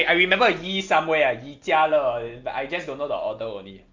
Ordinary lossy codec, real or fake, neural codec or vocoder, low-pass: Opus, 32 kbps; real; none; 7.2 kHz